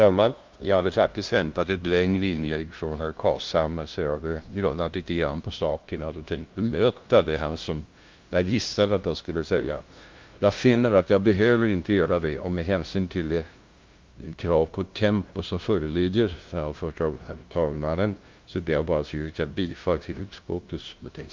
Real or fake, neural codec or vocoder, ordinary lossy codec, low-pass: fake; codec, 16 kHz, 0.5 kbps, FunCodec, trained on LibriTTS, 25 frames a second; Opus, 24 kbps; 7.2 kHz